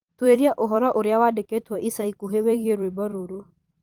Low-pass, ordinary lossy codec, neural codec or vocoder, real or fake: 19.8 kHz; Opus, 24 kbps; vocoder, 44.1 kHz, 128 mel bands every 256 samples, BigVGAN v2; fake